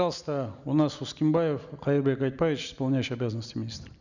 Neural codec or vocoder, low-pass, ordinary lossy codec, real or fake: none; 7.2 kHz; none; real